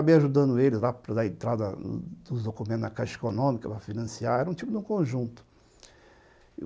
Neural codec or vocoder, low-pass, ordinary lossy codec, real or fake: none; none; none; real